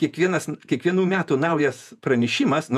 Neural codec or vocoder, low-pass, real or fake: vocoder, 48 kHz, 128 mel bands, Vocos; 14.4 kHz; fake